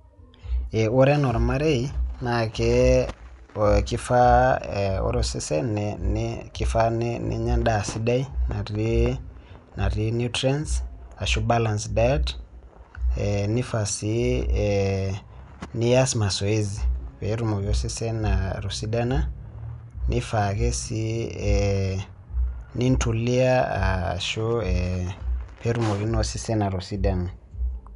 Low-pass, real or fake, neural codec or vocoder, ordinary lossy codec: 10.8 kHz; real; none; none